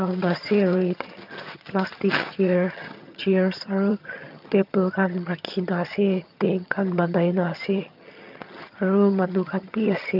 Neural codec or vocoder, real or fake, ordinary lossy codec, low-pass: vocoder, 22.05 kHz, 80 mel bands, HiFi-GAN; fake; none; 5.4 kHz